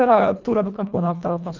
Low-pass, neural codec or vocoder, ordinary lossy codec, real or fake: 7.2 kHz; codec, 24 kHz, 1.5 kbps, HILCodec; none; fake